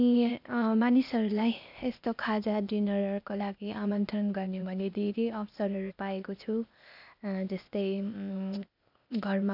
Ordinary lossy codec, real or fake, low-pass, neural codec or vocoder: none; fake; 5.4 kHz; codec, 16 kHz, 0.8 kbps, ZipCodec